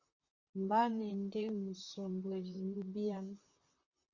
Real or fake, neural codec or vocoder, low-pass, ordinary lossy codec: fake; vocoder, 44.1 kHz, 128 mel bands, Pupu-Vocoder; 7.2 kHz; Opus, 64 kbps